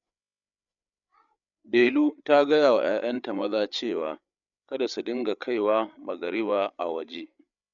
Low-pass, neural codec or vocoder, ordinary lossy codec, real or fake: 7.2 kHz; codec, 16 kHz, 8 kbps, FreqCodec, larger model; none; fake